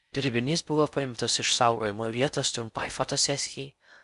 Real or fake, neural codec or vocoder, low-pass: fake; codec, 16 kHz in and 24 kHz out, 0.6 kbps, FocalCodec, streaming, 4096 codes; 10.8 kHz